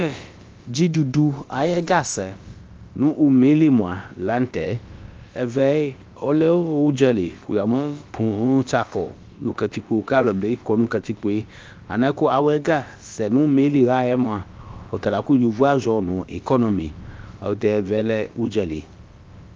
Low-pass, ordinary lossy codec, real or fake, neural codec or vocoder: 7.2 kHz; Opus, 24 kbps; fake; codec, 16 kHz, about 1 kbps, DyCAST, with the encoder's durations